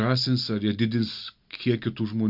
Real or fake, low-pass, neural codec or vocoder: real; 5.4 kHz; none